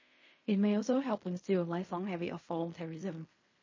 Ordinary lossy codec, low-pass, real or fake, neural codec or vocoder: MP3, 32 kbps; 7.2 kHz; fake; codec, 16 kHz in and 24 kHz out, 0.4 kbps, LongCat-Audio-Codec, fine tuned four codebook decoder